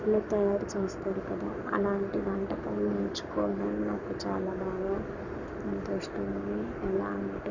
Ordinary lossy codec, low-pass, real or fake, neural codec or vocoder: none; 7.2 kHz; fake; codec, 44.1 kHz, 7.8 kbps, Pupu-Codec